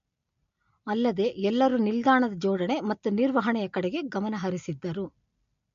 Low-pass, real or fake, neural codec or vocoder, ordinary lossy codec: 7.2 kHz; real; none; MP3, 48 kbps